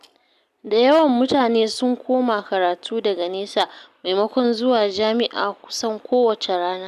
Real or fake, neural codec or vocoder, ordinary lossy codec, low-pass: real; none; none; 14.4 kHz